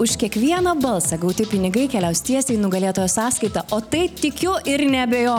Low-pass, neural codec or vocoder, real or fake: 19.8 kHz; none; real